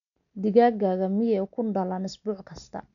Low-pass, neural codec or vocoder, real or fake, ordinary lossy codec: 7.2 kHz; none; real; MP3, 64 kbps